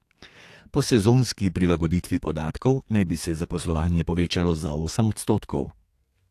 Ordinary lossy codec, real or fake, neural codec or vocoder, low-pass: AAC, 64 kbps; fake; codec, 32 kHz, 1.9 kbps, SNAC; 14.4 kHz